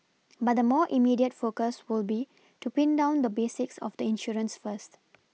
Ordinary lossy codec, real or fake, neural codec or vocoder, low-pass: none; real; none; none